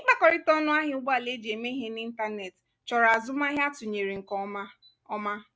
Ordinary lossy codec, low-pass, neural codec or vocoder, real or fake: none; none; none; real